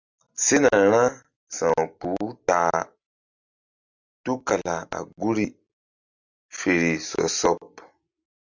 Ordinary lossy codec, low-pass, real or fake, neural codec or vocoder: Opus, 64 kbps; 7.2 kHz; real; none